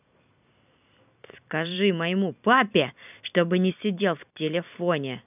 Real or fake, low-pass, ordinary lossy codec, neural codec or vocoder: fake; 3.6 kHz; none; vocoder, 44.1 kHz, 128 mel bands every 256 samples, BigVGAN v2